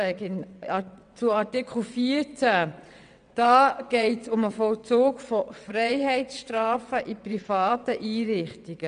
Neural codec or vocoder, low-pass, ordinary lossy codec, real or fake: vocoder, 22.05 kHz, 80 mel bands, WaveNeXt; 9.9 kHz; none; fake